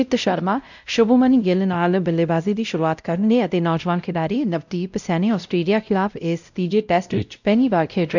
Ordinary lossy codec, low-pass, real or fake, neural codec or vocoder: none; 7.2 kHz; fake; codec, 16 kHz, 0.5 kbps, X-Codec, WavLM features, trained on Multilingual LibriSpeech